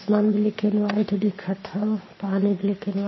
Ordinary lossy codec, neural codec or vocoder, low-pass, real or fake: MP3, 24 kbps; codec, 44.1 kHz, 7.8 kbps, Pupu-Codec; 7.2 kHz; fake